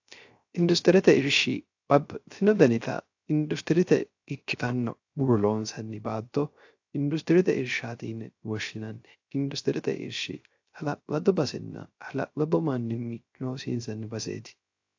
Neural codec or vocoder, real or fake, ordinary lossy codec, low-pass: codec, 16 kHz, 0.3 kbps, FocalCodec; fake; AAC, 48 kbps; 7.2 kHz